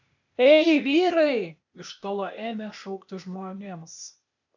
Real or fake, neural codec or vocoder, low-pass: fake; codec, 16 kHz, 0.8 kbps, ZipCodec; 7.2 kHz